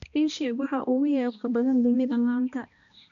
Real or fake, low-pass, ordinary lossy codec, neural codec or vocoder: fake; 7.2 kHz; none; codec, 16 kHz, 1 kbps, X-Codec, HuBERT features, trained on balanced general audio